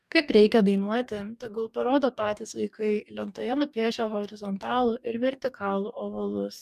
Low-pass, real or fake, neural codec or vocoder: 14.4 kHz; fake; codec, 44.1 kHz, 2.6 kbps, DAC